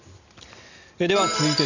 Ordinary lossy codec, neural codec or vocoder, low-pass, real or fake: none; none; 7.2 kHz; real